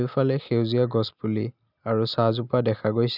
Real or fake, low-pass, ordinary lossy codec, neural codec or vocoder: real; 5.4 kHz; none; none